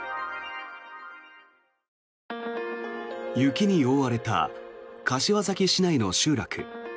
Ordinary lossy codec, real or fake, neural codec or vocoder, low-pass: none; real; none; none